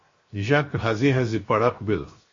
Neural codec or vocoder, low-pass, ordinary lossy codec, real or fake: codec, 16 kHz, 0.7 kbps, FocalCodec; 7.2 kHz; MP3, 32 kbps; fake